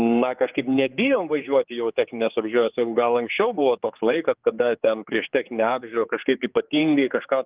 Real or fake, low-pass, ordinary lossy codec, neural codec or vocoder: fake; 3.6 kHz; Opus, 32 kbps; codec, 16 kHz, 4 kbps, X-Codec, HuBERT features, trained on general audio